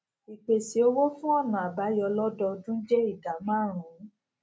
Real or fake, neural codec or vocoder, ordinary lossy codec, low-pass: real; none; none; none